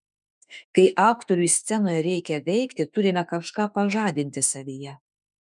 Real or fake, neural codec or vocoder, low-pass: fake; autoencoder, 48 kHz, 32 numbers a frame, DAC-VAE, trained on Japanese speech; 10.8 kHz